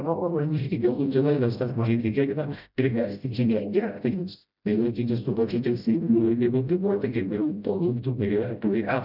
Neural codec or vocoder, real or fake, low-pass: codec, 16 kHz, 0.5 kbps, FreqCodec, smaller model; fake; 5.4 kHz